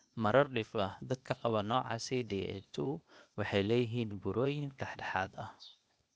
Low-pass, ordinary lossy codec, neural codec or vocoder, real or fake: none; none; codec, 16 kHz, 0.8 kbps, ZipCodec; fake